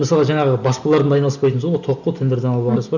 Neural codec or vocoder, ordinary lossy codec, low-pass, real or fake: none; none; 7.2 kHz; real